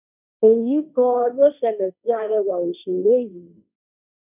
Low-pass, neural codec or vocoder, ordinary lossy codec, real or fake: 3.6 kHz; codec, 16 kHz, 1.1 kbps, Voila-Tokenizer; none; fake